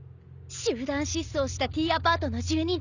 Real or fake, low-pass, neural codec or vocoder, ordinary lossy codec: real; 7.2 kHz; none; none